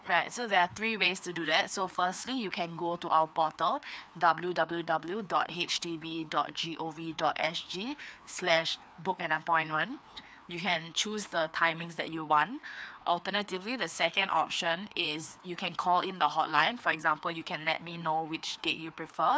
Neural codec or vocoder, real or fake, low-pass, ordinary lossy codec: codec, 16 kHz, 2 kbps, FreqCodec, larger model; fake; none; none